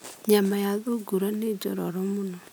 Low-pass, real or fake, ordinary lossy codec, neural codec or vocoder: none; real; none; none